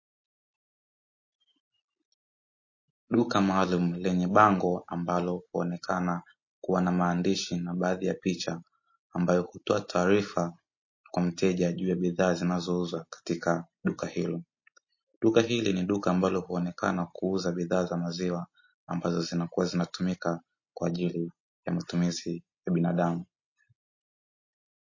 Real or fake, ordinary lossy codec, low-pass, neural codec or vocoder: real; MP3, 32 kbps; 7.2 kHz; none